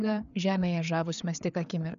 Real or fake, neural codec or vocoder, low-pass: fake; codec, 16 kHz, 4 kbps, FreqCodec, larger model; 7.2 kHz